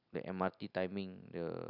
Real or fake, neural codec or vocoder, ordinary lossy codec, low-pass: real; none; none; 5.4 kHz